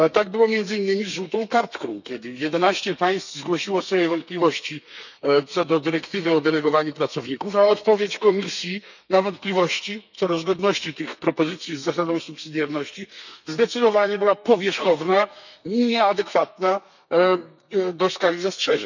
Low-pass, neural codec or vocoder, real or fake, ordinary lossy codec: 7.2 kHz; codec, 32 kHz, 1.9 kbps, SNAC; fake; none